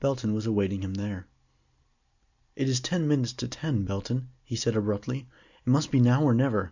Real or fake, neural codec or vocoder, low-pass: real; none; 7.2 kHz